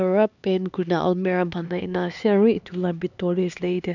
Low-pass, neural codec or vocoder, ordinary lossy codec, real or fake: 7.2 kHz; codec, 16 kHz, 2 kbps, X-Codec, HuBERT features, trained on LibriSpeech; none; fake